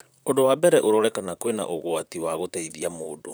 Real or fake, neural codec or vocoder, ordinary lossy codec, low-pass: fake; vocoder, 44.1 kHz, 128 mel bands, Pupu-Vocoder; none; none